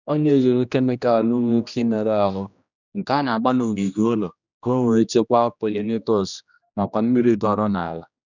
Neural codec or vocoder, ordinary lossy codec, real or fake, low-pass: codec, 16 kHz, 1 kbps, X-Codec, HuBERT features, trained on general audio; none; fake; 7.2 kHz